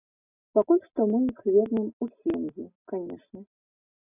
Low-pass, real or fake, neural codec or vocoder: 3.6 kHz; real; none